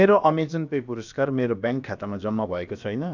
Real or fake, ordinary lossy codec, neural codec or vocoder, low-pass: fake; none; codec, 16 kHz, about 1 kbps, DyCAST, with the encoder's durations; 7.2 kHz